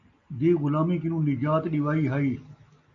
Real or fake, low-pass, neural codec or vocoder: real; 7.2 kHz; none